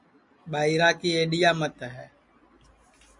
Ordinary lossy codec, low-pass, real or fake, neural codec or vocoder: MP3, 64 kbps; 10.8 kHz; real; none